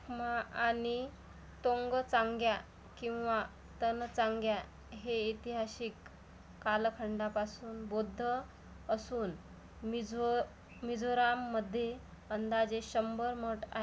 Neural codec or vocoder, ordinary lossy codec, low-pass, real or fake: none; none; none; real